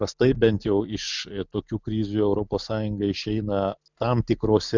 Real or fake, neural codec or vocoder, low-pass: real; none; 7.2 kHz